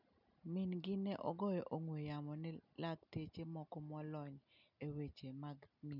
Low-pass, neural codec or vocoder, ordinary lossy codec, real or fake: 5.4 kHz; none; none; real